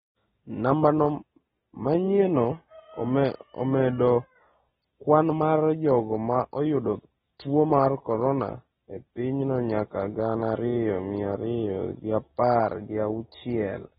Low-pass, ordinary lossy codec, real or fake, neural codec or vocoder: 19.8 kHz; AAC, 16 kbps; real; none